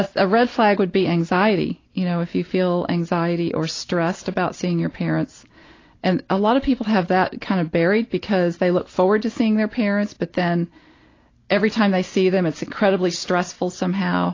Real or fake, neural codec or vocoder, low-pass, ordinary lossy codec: real; none; 7.2 kHz; AAC, 32 kbps